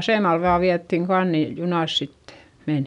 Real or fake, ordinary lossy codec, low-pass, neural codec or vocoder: real; none; 10.8 kHz; none